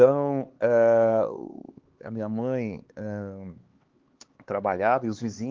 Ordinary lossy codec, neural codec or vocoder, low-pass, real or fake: Opus, 16 kbps; codec, 16 kHz, 4 kbps, X-Codec, HuBERT features, trained on LibriSpeech; 7.2 kHz; fake